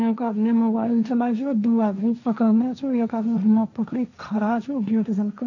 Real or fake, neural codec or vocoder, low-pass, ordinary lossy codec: fake; codec, 16 kHz, 1.1 kbps, Voila-Tokenizer; 7.2 kHz; none